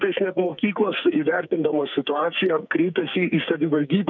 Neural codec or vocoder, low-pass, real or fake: codec, 44.1 kHz, 2.6 kbps, SNAC; 7.2 kHz; fake